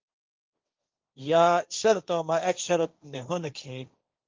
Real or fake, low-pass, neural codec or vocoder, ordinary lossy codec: fake; 7.2 kHz; codec, 16 kHz, 1.1 kbps, Voila-Tokenizer; Opus, 24 kbps